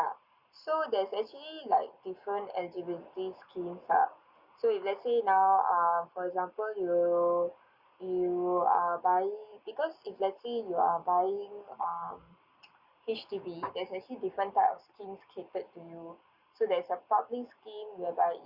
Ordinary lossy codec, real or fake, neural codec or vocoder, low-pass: Opus, 64 kbps; real; none; 5.4 kHz